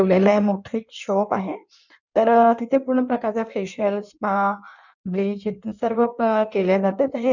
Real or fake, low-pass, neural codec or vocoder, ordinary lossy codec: fake; 7.2 kHz; codec, 16 kHz in and 24 kHz out, 1.1 kbps, FireRedTTS-2 codec; none